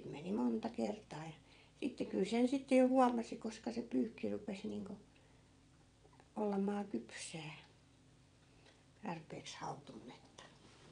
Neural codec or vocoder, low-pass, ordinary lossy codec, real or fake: vocoder, 22.05 kHz, 80 mel bands, WaveNeXt; 9.9 kHz; none; fake